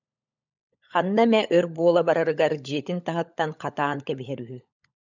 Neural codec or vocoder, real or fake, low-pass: codec, 16 kHz, 16 kbps, FunCodec, trained on LibriTTS, 50 frames a second; fake; 7.2 kHz